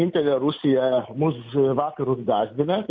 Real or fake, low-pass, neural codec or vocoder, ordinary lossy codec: real; 7.2 kHz; none; MP3, 64 kbps